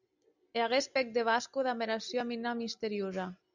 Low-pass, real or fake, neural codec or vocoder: 7.2 kHz; real; none